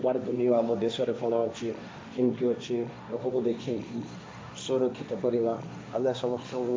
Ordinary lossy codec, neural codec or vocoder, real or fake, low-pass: none; codec, 16 kHz, 1.1 kbps, Voila-Tokenizer; fake; none